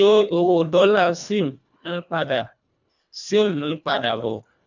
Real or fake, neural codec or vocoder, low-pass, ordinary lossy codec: fake; codec, 24 kHz, 1.5 kbps, HILCodec; 7.2 kHz; none